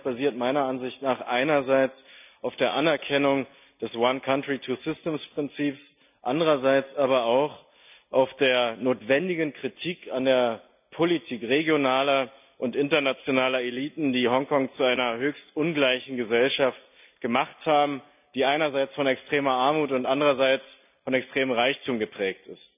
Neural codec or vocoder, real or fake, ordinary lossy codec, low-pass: none; real; none; 3.6 kHz